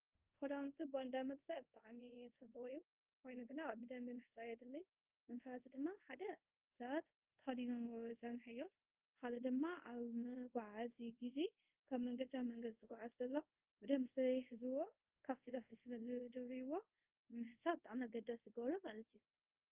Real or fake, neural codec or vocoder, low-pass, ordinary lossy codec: fake; codec, 24 kHz, 0.5 kbps, DualCodec; 3.6 kHz; Opus, 32 kbps